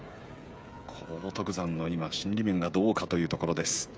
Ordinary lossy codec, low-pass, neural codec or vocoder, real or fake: none; none; codec, 16 kHz, 16 kbps, FreqCodec, smaller model; fake